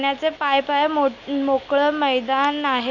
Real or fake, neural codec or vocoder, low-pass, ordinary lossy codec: real; none; 7.2 kHz; none